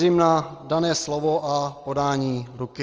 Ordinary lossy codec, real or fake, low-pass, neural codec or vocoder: Opus, 24 kbps; real; 7.2 kHz; none